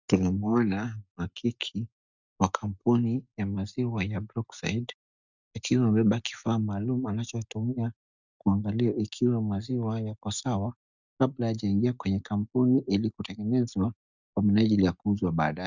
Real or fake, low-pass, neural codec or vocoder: real; 7.2 kHz; none